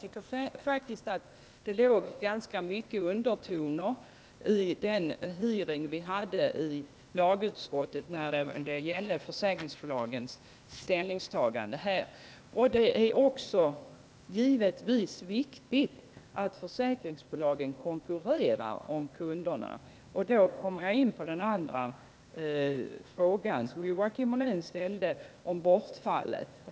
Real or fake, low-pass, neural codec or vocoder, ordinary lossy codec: fake; none; codec, 16 kHz, 0.8 kbps, ZipCodec; none